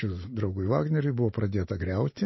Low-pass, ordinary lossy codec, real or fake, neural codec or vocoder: 7.2 kHz; MP3, 24 kbps; real; none